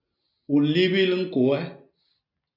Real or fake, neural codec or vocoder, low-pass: real; none; 5.4 kHz